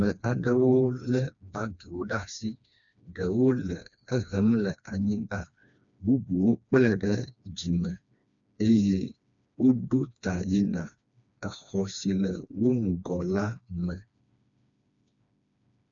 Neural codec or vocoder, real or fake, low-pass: codec, 16 kHz, 2 kbps, FreqCodec, smaller model; fake; 7.2 kHz